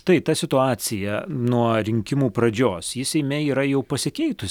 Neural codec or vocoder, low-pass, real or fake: none; 19.8 kHz; real